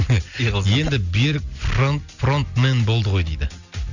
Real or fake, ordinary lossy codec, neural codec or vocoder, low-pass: real; none; none; 7.2 kHz